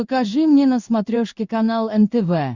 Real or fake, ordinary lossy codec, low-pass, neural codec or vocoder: fake; Opus, 64 kbps; 7.2 kHz; codec, 16 kHz in and 24 kHz out, 1 kbps, XY-Tokenizer